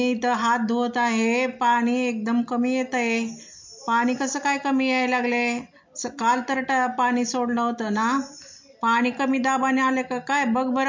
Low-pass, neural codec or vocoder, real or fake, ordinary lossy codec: 7.2 kHz; none; real; MP3, 48 kbps